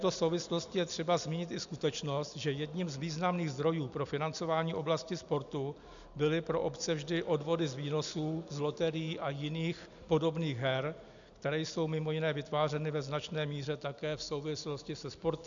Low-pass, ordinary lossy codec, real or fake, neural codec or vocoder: 7.2 kHz; MP3, 96 kbps; real; none